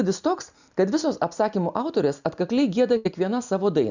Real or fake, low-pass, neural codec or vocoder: real; 7.2 kHz; none